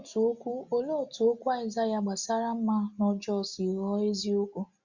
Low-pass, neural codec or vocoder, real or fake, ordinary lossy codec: 7.2 kHz; none; real; Opus, 64 kbps